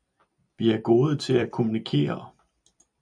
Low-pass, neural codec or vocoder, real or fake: 9.9 kHz; vocoder, 44.1 kHz, 128 mel bands every 256 samples, BigVGAN v2; fake